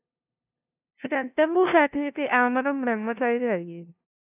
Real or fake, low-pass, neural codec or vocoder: fake; 3.6 kHz; codec, 16 kHz, 0.5 kbps, FunCodec, trained on LibriTTS, 25 frames a second